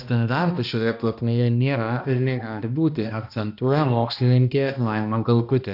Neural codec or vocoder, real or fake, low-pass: codec, 16 kHz, 1 kbps, X-Codec, HuBERT features, trained on balanced general audio; fake; 5.4 kHz